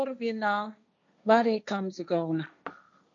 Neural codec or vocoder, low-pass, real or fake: codec, 16 kHz, 1.1 kbps, Voila-Tokenizer; 7.2 kHz; fake